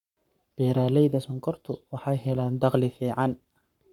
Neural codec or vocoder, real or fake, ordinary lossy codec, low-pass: codec, 44.1 kHz, 7.8 kbps, Pupu-Codec; fake; none; 19.8 kHz